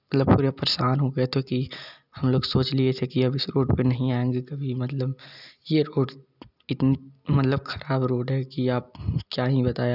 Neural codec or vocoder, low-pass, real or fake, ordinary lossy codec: none; 5.4 kHz; real; none